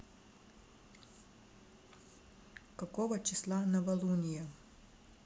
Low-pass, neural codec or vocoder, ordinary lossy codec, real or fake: none; none; none; real